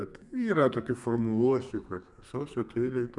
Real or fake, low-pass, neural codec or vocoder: fake; 10.8 kHz; codec, 32 kHz, 1.9 kbps, SNAC